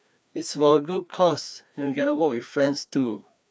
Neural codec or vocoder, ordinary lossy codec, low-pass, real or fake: codec, 16 kHz, 2 kbps, FreqCodec, larger model; none; none; fake